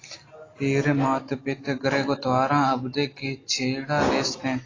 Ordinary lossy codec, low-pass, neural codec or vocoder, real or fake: AAC, 32 kbps; 7.2 kHz; none; real